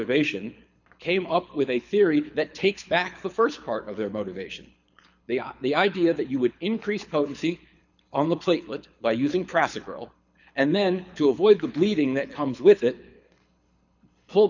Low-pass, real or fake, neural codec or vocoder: 7.2 kHz; fake; codec, 24 kHz, 6 kbps, HILCodec